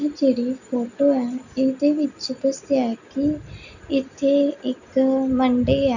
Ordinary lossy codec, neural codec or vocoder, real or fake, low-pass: none; none; real; 7.2 kHz